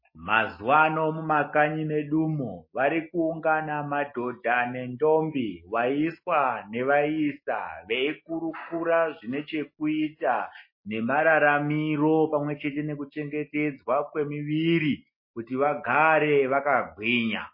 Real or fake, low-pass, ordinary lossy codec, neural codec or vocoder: real; 5.4 kHz; MP3, 24 kbps; none